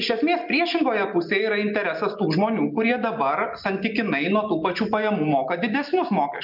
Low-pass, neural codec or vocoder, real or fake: 5.4 kHz; none; real